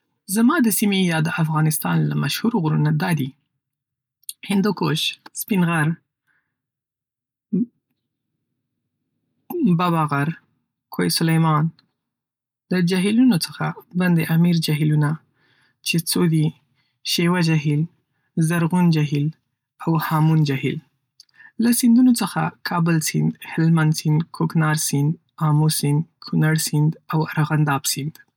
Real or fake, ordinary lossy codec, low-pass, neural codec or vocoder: real; none; 19.8 kHz; none